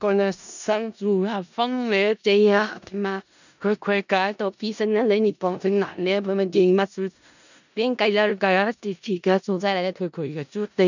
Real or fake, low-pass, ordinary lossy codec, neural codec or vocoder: fake; 7.2 kHz; none; codec, 16 kHz in and 24 kHz out, 0.4 kbps, LongCat-Audio-Codec, four codebook decoder